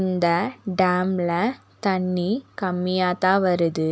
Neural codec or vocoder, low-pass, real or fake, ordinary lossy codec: none; none; real; none